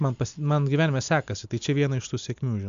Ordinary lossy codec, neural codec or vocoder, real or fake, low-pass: MP3, 64 kbps; none; real; 7.2 kHz